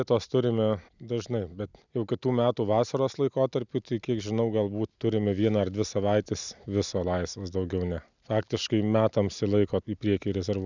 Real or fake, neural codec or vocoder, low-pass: real; none; 7.2 kHz